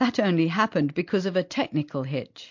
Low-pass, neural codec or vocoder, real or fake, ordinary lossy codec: 7.2 kHz; none; real; MP3, 48 kbps